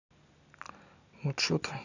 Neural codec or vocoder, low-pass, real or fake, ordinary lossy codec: none; 7.2 kHz; real; none